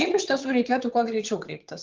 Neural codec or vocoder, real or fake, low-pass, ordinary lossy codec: vocoder, 22.05 kHz, 80 mel bands, WaveNeXt; fake; 7.2 kHz; Opus, 32 kbps